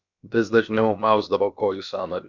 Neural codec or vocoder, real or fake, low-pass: codec, 16 kHz, about 1 kbps, DyCAST, with the encoder's durations; fake; 7.2 kHz